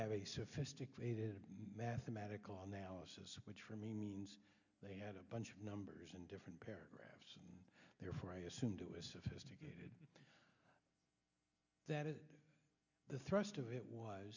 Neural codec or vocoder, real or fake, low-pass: none; real; 7.2 kHz